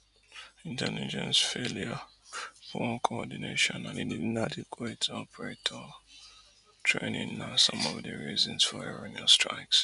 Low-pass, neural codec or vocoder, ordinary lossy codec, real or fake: 10.8 kHz; none; none; real